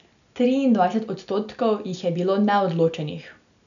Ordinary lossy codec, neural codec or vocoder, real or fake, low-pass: none; none; real; 7.2 kHz